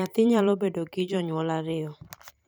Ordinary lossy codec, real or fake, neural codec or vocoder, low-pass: none; fake; vocoder, 44.1 kHz, 128 mel bands every 512 samples, BigVGAN v2; none